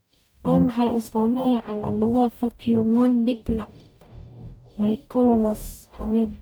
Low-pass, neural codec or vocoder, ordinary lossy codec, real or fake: none; codec, 44.1 kHz, 0.9 kbps, DAC; none; fake